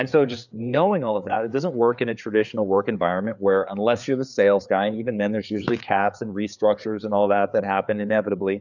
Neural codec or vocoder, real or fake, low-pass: codec, 16 kHz, 4 kbps, FreqCodec, larger model; fake; 7.2 kHz